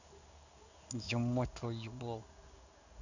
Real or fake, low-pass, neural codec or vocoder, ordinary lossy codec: fake; 7.2 kHz; codec, 16 kHz in and 24 kHz out, 1 kbps, XY-Tokenizer; none